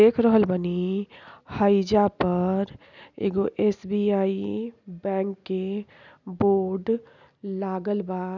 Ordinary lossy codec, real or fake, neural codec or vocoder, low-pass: none; real; none; 7.2 kHz